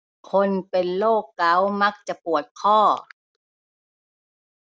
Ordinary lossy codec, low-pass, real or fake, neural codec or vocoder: none; none; real; none